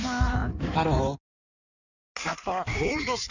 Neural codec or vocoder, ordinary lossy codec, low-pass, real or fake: codec, 16 kHz in and 24 kHz out, 1.1 kbps, FireRedTTS-2 codec; none; 7.2 kHz; fake